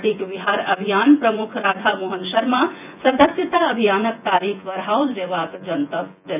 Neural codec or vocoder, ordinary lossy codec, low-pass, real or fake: vocoder, 24 kHz, 100 mel bands, Vocos; none; 3.6 kHz; fake